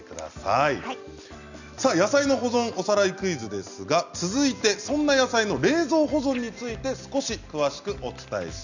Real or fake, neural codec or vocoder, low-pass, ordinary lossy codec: real; none; 7.2 kHz; none